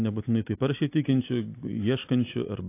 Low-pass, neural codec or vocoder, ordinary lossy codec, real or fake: 3.6 kHz; none; AAC, 24 kbps; real